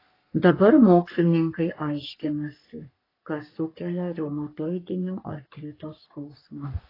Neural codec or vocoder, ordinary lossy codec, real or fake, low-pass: codec, 44.1 kHz, 3.4 kbps, Pupu-Codec; AAC, 24 kbps; fake; 5.4 kHz